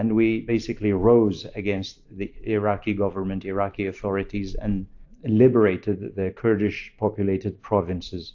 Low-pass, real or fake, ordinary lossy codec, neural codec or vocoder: 7.2 kHz; fake; AAC, 48 kbps; vocoder, 44.1 kHz, 128 mel bands every 512 samples, BigVGAN v2